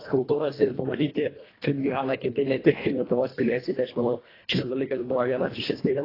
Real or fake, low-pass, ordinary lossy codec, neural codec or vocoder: fake; 5.4 kHz; AAC, 24 kbps; codec, 24 kHz, 1.5 kbps, HILCodec